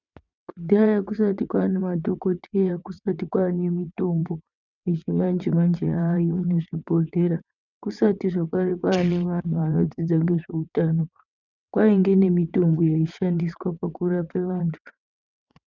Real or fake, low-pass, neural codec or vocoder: fake; 7.2 kHz; vocoder, 22.05 kHz, 80 mel bands, WaveNeXt